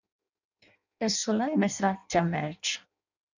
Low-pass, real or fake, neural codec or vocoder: 7.2 kHz; fake; codec, 16 kHz in and 24 kHz out, 0.6 kbps, FireRedTTS-2 codec